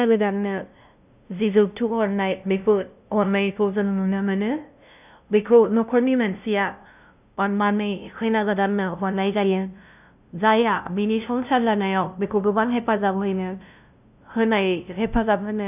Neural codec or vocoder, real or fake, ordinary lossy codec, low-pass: codec, 16 kHz, 0.5 kbps, FunCodec, trained on LibriTTS, 25 frames a second; fake; none; 3.6 kHz